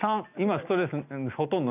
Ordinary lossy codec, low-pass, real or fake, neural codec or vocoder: none; 3.6 kHz; real; none